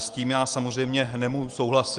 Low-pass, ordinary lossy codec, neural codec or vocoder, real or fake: 9.9 kHz; Opus, 24 kbps; none; real